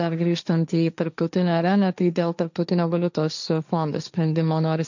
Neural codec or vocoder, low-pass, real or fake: codec, 16 kHz, 1.1 kbps, Voila-Tokenizer; 7.2 kHz; fake